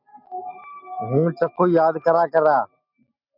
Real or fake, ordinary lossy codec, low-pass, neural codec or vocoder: real; MP3, 48 kbps; 5.4 kHz; none